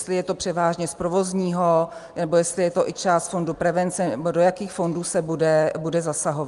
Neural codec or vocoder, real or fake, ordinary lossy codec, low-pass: none; real; Opus, 32 kbps; 10.8 kHz